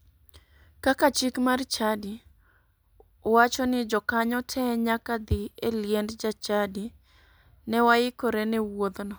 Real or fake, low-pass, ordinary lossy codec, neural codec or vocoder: real; none; none; none